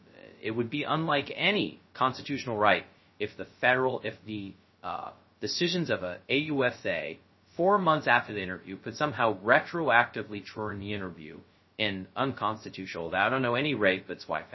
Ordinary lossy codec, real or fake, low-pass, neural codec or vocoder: MP3, 24 kbps; fake; 7.2 kHz; codec, 16 kHz, 0.2 kbps, FocalCodec